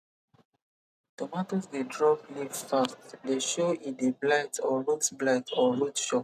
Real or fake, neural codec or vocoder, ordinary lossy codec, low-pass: real; none; none; 14.4 kHz